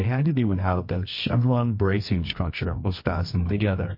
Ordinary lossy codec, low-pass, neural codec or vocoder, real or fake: MP3, 32 kbps; 5.4 kHz; codec, 24 kHz, 0.9 kbps, WavTokenizer, medium music audio release; fake